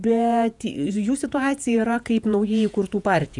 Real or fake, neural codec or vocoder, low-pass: fake; vocoder, 48 kHz, 128 mel bands, Vocos; 10.8 kHz